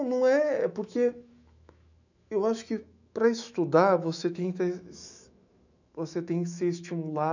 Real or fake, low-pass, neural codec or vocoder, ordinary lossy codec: fake; 7.2 kHz; autoencoder, 48 kHz, 128 numbers a frame, DAC-VAE, trained on Japanese speech; none